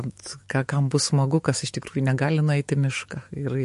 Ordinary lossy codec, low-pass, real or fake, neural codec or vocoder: MP3, 48 kbps; 14.4 kHz; fake; autoencoder, 48 kHz, 128 numbers a frame, DAC-VAE, trained on Japanese speech